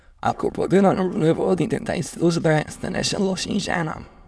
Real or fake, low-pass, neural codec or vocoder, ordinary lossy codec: fake; none; autoencoder, 22.05 kHz, a latent of 192 numbers a frame, VITS, trained on many speakers; none